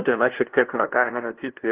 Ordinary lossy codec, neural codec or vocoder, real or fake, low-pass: Opus, 16 kbps; codec, 16 kHz, 0.5 kbps, FunCodec, trained on LibriTTS, 25 frames a second; fake; 3.6 kHz